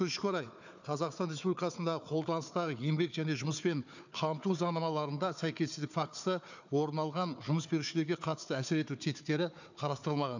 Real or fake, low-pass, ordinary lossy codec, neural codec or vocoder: fake; 7.2 kHz; none; codec, 16 kHz, 4 kbps, FunCodec, trained on Chinese and English, 50 frames a second